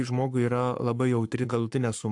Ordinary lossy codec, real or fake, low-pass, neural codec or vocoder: AAC, 64 kbps; fake; 10.8 kHz; codec, 44.1 kHz, 7.8 kbps, Pupu-Codec